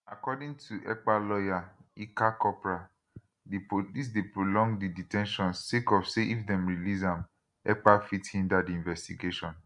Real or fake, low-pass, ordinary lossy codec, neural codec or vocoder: real; 10.8 kHz; none; none